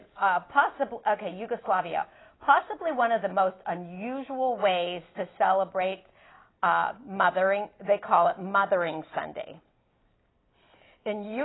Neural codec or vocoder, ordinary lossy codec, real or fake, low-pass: none; AAC, 16 kbps; real; 7.2 kHz